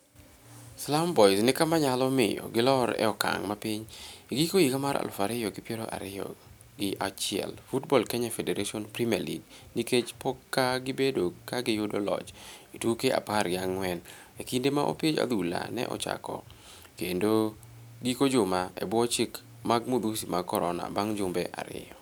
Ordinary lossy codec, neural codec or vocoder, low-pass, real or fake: none; none; none; real